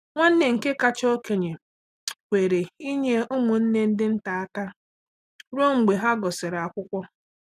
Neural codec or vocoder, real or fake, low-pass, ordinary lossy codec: none; real; 14.4 kHz; none